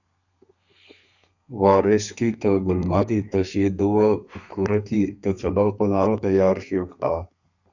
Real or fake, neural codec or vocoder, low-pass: fake; codec, 32 kHz, 1.9 kbps, SNAC; 7.2 kHz